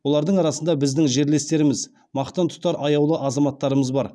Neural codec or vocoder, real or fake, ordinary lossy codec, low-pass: none; real; none; none